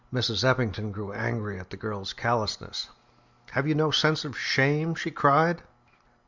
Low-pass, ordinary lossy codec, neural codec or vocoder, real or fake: 7.2 kHz; Opus, 64 kbps; none; real